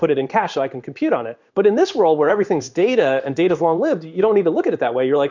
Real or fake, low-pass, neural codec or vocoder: fake; 7.2 kHz; codec, 16 kHz in and 24 kHz out, 1 kbps, XY-Tokenizer